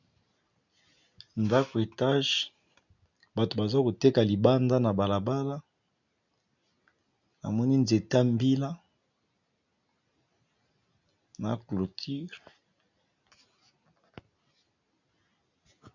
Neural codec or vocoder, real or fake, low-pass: none; real; 7.2 kHz